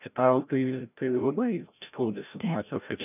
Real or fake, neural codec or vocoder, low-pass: fake; codec, 16 kHz, 0.5 kbps, FreqCodec, larger model; 3.6 kHz